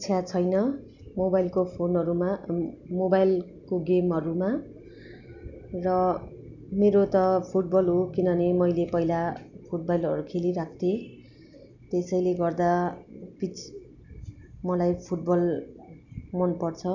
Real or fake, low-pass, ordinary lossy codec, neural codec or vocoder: real; 7.2 kHz; none; none